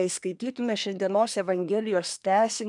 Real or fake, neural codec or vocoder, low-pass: fake; codec, 24 kHz, 1 kbps, SNAC; 10.8 kHz